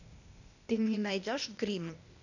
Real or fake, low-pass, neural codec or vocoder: fake; 7.2 kHz; codec, 16 kHz, 0.8 kbps, ZipCodec